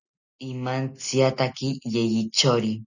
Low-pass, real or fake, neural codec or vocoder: 7.2 kHz; real; none